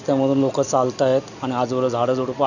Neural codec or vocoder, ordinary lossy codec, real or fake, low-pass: none; none; real; 7.2 kHz